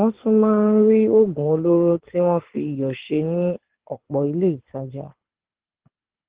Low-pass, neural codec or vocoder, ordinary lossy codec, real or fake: 3.6 kHz; codec, 24 kHz, 6 kbps, HILCodec; Opus, 16 kbps; fake